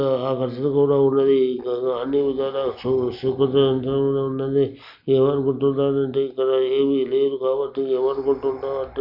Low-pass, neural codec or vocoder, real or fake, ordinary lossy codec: 5.4 kHz; none; real; none